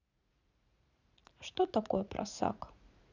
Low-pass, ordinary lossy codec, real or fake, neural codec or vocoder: 7.2 kHz; none; fake; vocoder, 44.1 kHz, 80 mel bands, Vocos